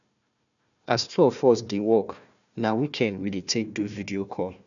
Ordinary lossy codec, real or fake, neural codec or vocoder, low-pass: none; fake; codec, 16 kHz, 1 kbps, FunCodec, trained on Chinese and English, 50 frames a second; 7.2 kHz